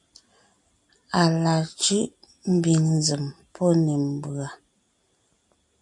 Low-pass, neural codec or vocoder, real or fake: 10.8 kHz; none; real